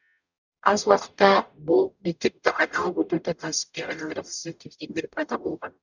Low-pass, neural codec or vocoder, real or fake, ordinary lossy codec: 7.2 kHz; codec, 44.1 kHz, 0.9 kbps, DAC; fake; none